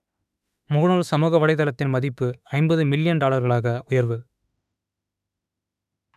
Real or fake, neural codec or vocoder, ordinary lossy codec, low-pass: fake; autoencoder, 48 kHz, 32 numbers a frame, DAC-VAE, trained on Japanese speech; none; 14.4 kHz